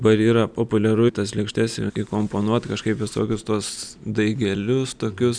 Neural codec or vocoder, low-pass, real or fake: none; 9.9 kHz; real